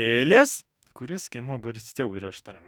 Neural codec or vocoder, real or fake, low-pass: codec, 44.1 kHz, 2.6 kbps, DAC; fake; 19.8 kHz